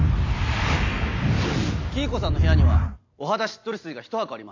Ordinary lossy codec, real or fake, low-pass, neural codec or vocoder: AAC, 48 kbps; real; 7.2 kHz; none